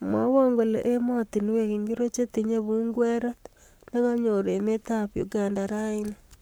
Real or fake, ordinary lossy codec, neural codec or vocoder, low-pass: fake; none; codec, 44.1 kHz, 7.8 kbps, Pupu-Codec; none